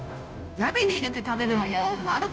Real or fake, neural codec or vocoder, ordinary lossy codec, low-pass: fake; codec, 16 kHz, 0.5 kbps, FunCodec, trained on Chinese and English, 25 frames a second; none; none